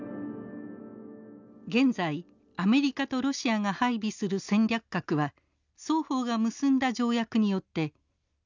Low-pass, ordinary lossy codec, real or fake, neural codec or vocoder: 7.2 kHz; none; real; none